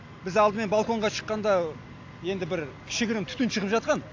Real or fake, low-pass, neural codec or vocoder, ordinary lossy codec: real; 7.2 kHz; none; AAC, 48 kbps